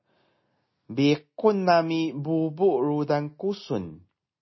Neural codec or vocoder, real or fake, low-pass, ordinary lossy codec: none; real; 7.2 kHz; MP3, 24 kbps